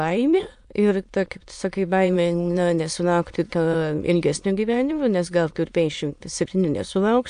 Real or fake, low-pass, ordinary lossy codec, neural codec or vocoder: fake; 9.9 kHz; AAC, 64 kbps; autoencoder, 22.05 kHz, a latent of 192 numbers a frame, VITS, trained on many speakers